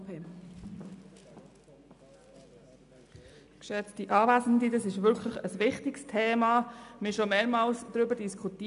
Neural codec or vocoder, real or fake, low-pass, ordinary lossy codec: none; real; 10.8 kHz; MP3, 96 kbps